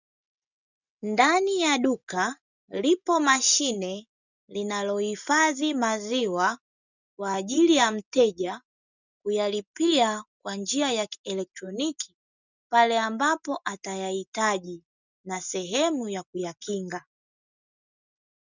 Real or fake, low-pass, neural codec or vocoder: real; 7.2 kHz; none